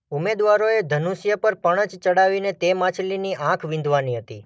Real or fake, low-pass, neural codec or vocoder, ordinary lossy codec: real; none; none; none